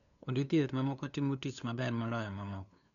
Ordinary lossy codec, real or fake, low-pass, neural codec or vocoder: none; fake; 7.2 kHz; codec, 16 kHz, 2 kbps, FunCodec, trained on LibriTTS, 25 frames a second